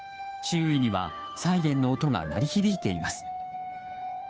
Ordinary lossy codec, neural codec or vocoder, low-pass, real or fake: none; codec, 16 kHz, 2 kbps, FunCodec, trained on Chinese and English, 25 frames a second; none; fake